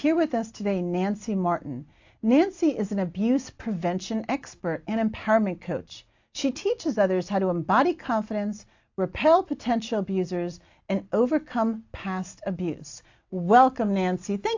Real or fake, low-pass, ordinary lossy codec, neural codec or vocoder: real; 7.2 kHz; AAC, 48 kbps; none